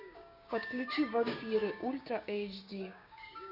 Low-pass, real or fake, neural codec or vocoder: 5.4 kHz; fake; codec, 16 kHz, 6 kbps, DAC